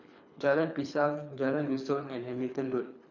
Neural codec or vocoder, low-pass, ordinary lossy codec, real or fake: codec, 24 kHz, 3 kbps, HILCodec; 7.2 kHz; none; fake